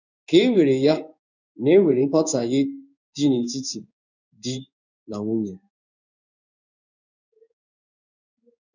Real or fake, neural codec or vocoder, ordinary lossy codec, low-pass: fake; codec, 16 kHz in and 24 kHz out, 1 kbps, XY-Tokenizer; none; 7.2 kHz